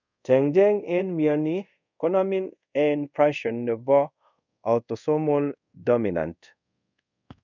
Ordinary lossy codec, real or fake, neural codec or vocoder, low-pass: none; fake; codec, 24 kHz, 0.5 kbps, DualCodec; 7.2 kHz